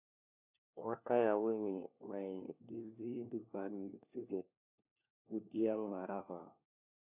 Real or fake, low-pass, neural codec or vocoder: fake; 3.6 kHz; codec, 16 kHz, 1 kbps, FunCodec, trained on LibriTTS, 50 frames a second